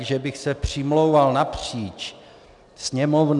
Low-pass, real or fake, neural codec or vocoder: 10.8 kHz; real; none